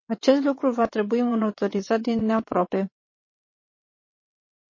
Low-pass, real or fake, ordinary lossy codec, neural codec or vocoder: 7.2 kHz; fake; MP3, 32 kbps; vocoder, 22.05 kHz, 80 mel bands, WaveNeXt